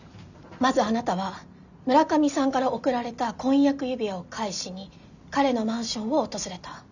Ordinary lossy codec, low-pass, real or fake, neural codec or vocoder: none; 7.2 kHz; real; none